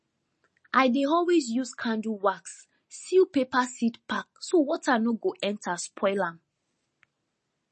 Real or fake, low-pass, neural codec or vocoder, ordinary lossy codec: real; 10.8 kHz; none; MP3, 32 kbps